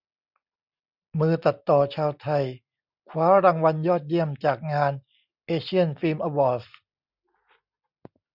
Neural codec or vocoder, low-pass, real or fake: none; 5.4 kHz; real